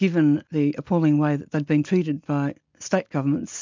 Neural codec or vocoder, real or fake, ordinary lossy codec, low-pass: none; real; MP3, 64 kbps; 7.2 kHz